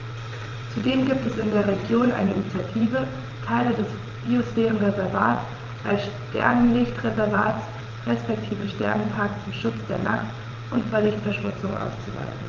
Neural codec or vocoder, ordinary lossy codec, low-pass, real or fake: vocoder, 22.05 kHz, 80 mel bands, WaveNeXt; Opus, 32 kbps; 7.2 kHz; fake